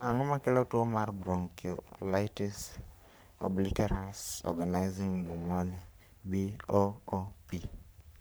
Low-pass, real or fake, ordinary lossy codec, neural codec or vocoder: none; fake; none; codec, 44.1 kHz, 3.4 kbps, Pupu-Codec